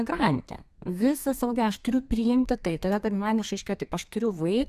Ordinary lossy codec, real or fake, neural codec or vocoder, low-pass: Opus, 64 kbps; fake; codec, 32 kHz, 1.9 kbps, SNAC; 14.4 kHz